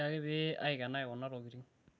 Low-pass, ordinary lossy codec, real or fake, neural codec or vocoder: none; none; real; none